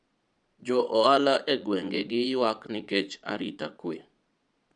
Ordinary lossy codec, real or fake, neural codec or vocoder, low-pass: none; fake; vocoder, 22.05 kHz, 80 mel bands, Vocos; 9.9 kHz